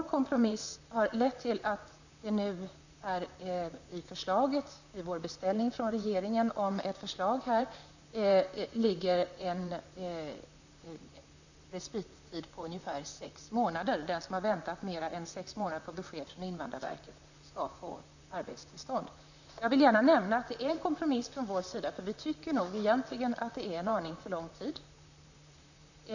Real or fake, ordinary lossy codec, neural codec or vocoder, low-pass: fake; none; vocoder, 44.1 kHz, 128 mel bands, Pupu-Vocoder; 7.2 kHz